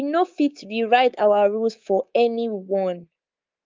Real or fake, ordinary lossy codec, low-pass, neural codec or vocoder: fake; Opus, 24 kbps; 7.2 kHz; codec, 24 kHz, 3.1 kbps, DualCodec